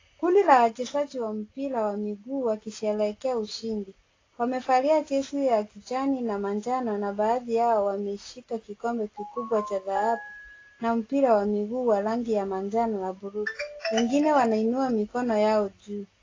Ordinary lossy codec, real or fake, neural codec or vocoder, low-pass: AAC, 32 kbps; real; none; 7.2 kHz